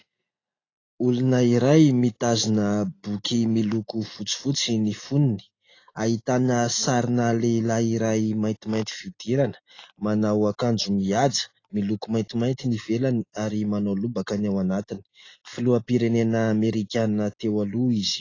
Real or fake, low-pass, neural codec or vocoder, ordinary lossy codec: real; 7.2 kHz; none; AAC, 32 kbps